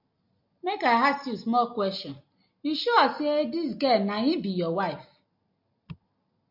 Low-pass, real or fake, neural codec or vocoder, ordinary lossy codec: 5.4 kHz; real; none; MP3, 48 kbps